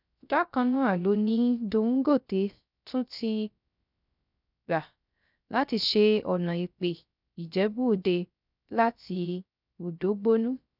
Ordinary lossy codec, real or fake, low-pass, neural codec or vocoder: none; fake; 5.4 kHz; codec, 16 kHz, 0.3 kbps, FocalCodec